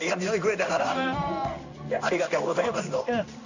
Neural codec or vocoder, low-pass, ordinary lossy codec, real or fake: codec, 16 kHz in and 24 kHz out, 1 kbps, XY-Tokenizer; 7.2 kHz; none; fake